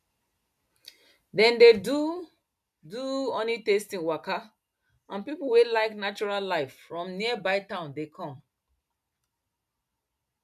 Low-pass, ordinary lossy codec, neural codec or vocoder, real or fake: 14.4 kHz; MP3, 96 kbps; none; real